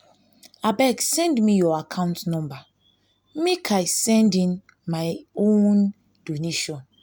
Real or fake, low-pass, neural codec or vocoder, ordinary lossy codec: real; none; none; none